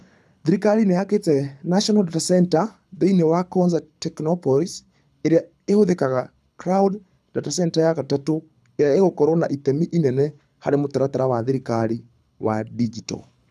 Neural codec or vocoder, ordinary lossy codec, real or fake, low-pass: codec, 24 kHz, 6 kbps, HILCodec; none; fake; none